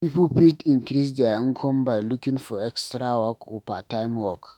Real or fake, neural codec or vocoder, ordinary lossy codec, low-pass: fake; autoencoder, 48 kHz, 32 numbers a frame, DAC-VAE, trained on Japanese speech; none; 19.8 kHz